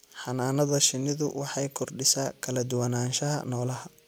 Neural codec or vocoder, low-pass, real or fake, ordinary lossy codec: none; none; real; none